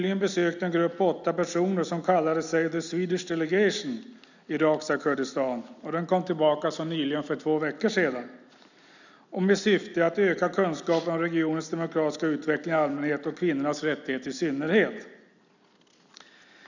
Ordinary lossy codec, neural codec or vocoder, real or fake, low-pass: none; none; real; 7.2 kHz